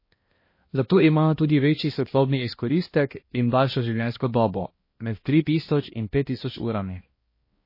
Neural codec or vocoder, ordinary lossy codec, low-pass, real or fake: codec, 16 kHz, 1 kbps, X-Codec, HuBERT features, trained on balanced general audio; MP3, 24 kbps; 5.4 kHz; fake